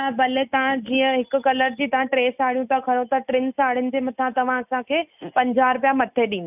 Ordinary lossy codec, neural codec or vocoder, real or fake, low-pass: none; none; real; 3.6 kHz